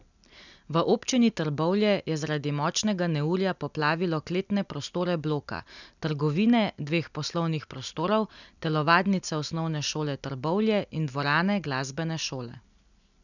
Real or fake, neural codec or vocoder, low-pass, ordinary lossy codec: real; none; 7.2 kHz; none